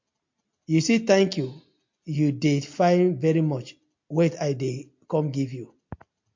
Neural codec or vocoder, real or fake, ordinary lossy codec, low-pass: none; real; MP3, 48 kbps; 7.2 kHz